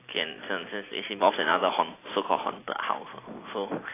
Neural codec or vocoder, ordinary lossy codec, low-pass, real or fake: none; AAC, 16 kbps; 3.6 kHz; real